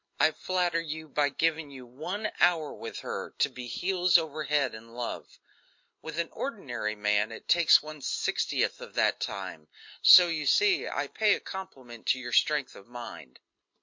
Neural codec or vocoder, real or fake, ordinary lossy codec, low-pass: none; real; MP3, 48 kbps; 7.2 kHz